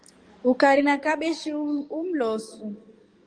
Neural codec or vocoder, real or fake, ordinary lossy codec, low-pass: codec, 44.1 kHz, 7.8 kbps, DAC; fake; Opus, 32 kbps; 9.9 kHz